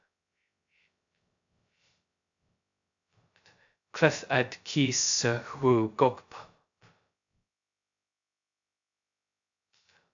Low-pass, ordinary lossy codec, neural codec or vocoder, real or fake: 7.2 kHz; AAC, 64 kbps; codec, 16 kHz, 0.2 kbps, FocalCodec; fake